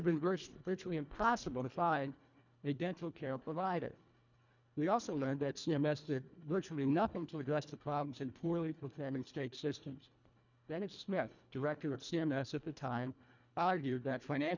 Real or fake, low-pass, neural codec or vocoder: fake; 7.2 kHz; codec, 24 kHz, 1.5 kbps, HILCodec